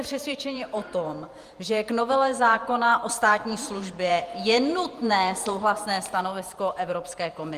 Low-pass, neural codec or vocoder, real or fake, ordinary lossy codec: 14.4 kHz; vocoder, 48 kHz, 128 mel bands, Vocos; fake; Opus, 32 kbps